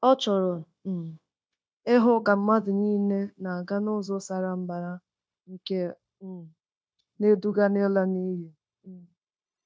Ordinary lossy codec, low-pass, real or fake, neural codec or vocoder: none; none; fake; codec, 16 kHz, 0.9 kbps, LongCat-Audio-Codec